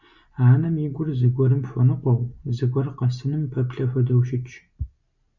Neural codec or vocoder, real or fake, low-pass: none; real; 7.2 kHz